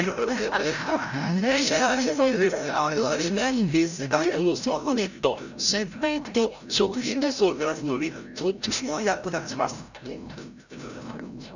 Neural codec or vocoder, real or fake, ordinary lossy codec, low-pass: codec, 16 kHz, 0.5 kbps, FreqCodec, larger model; fake; none; 7.2 kHz